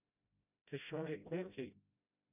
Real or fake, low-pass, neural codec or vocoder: fake; 3.6 kHz; codec, 16 kHz, 0.5 kbps, FreqCodec, smaller model